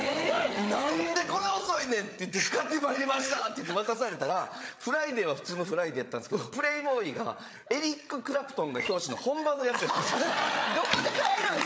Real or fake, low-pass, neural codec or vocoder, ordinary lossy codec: fake; none; codec, 16 kHz, 8 kbps, FreqCodec, larger model; none